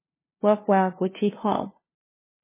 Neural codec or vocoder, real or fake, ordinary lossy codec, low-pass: codec, 16 kHz, 0.5 kbps, FunCodec, trained on LibriTTS, 25 frames a second; fake; MP3, 16 kbps; 3.6 kHz